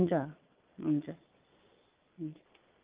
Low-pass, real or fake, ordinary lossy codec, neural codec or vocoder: 3.6 kHz; fake; Opus, 24 kbps; vocoder, 22.05 kHz, 80 mel bands, Vocos